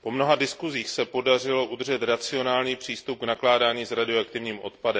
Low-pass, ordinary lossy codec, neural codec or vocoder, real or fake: none; none; none; real